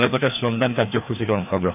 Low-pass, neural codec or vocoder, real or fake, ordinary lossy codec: 3.6 kHz; codec, 16 kHz, 2 kbps, FreqCodec, larger model; fake; none